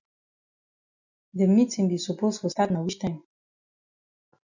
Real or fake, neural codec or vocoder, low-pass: real; none; 7.2 kHz